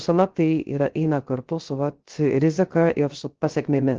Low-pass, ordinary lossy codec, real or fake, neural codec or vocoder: 7.2 kHz; Opus, 16 kbps; fake; codec, 16 kHz, 0.3 kbps, FocalCodec